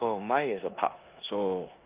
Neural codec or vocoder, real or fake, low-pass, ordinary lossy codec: codec, 16 kHz in and 24 kHz out, 0.9 kbps, LongCat-Audio-Codec, four codebook decoder; fake; 3.6 kHz; Opus, 24 kbps